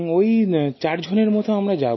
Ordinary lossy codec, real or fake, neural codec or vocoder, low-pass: MP3, 24 kbps; real; none; 7.2 kHz